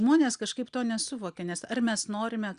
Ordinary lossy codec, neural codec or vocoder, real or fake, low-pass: Opus, 64 kbps; none; real; 9.9 kHz